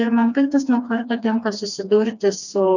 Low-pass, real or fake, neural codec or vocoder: 7.2 kHz; fake; codec, 16 kHz, 2 kbps, FreqCodec, smaller model